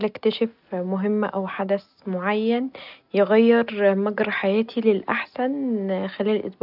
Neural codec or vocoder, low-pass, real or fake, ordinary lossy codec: none; 5.4 kHz; real; none